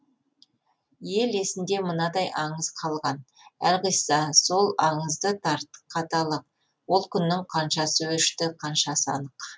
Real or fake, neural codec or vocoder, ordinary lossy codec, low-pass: real; none; none; none